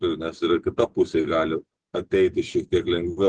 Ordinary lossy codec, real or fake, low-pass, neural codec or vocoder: Opus, 16 kbps; real; 9.9 kHz; none